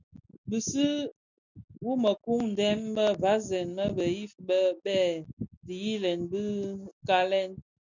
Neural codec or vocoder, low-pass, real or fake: none; 7.2 kHz; real